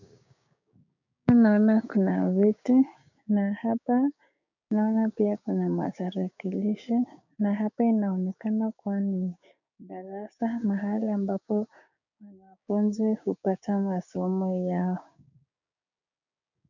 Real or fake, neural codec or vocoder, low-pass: fake; codec, 16 kHz, 6 kbps, DAC; 7.2 kHz